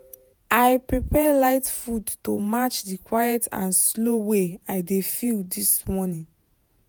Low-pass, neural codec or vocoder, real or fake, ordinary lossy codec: none; vocoder, 48 kHz, 128 mel bands, Vocos; fake; none